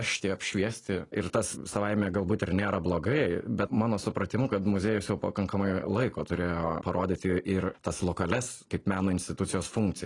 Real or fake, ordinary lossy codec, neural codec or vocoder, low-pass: real; AAC, 32 kbps; none; 10.8 kHz